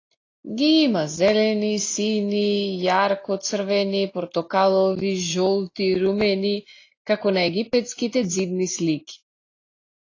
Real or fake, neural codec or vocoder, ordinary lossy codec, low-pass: real; none; AAC, 32 kbps; 7.2 kHz